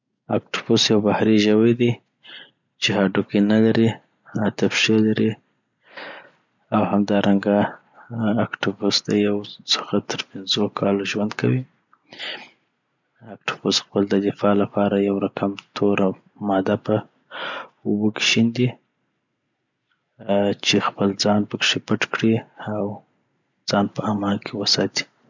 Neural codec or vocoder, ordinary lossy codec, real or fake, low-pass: none; none; real; 7.2 kHz